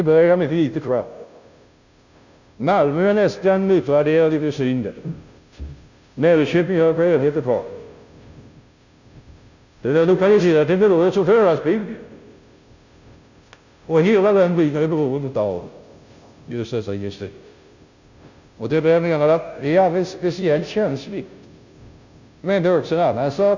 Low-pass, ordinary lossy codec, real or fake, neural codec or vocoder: 7.2 kHz; none; fake; codec, 16 kHz, 0.5 kbps, FunCodec, trained on Chinese and English, 25 frames a second